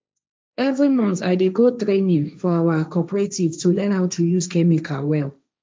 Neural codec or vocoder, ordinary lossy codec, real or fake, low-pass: codec, 16 kHz, 1.1 kbps, Voila-Tokenizer; none; fake; none